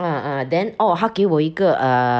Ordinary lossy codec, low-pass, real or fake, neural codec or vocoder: none; none; real; none